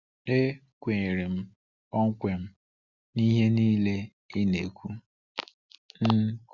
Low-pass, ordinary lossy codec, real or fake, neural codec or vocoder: 7.2 kHz; Opus, 64 kbps; real; none